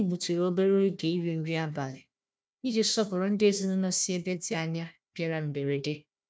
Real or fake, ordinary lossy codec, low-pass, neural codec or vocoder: fake; none; none; codec, 16 kHz, 1 kbps, FunCodec, trained on Chinese and English, 50 frames a second